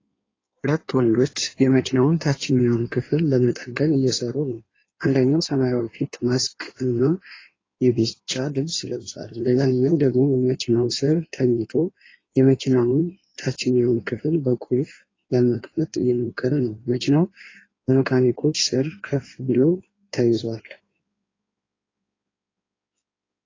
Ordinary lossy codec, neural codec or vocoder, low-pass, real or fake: AAC, 32 kbps; codec, 16 kHz in and 24 kHz out, 1.1 kbps, FireRedTTS-2 codec; 7.2 kHz; fake